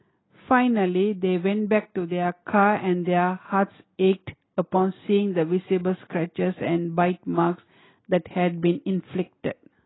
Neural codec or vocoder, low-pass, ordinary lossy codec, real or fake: none; 7.2 kHz; AAC, 16 kbps; real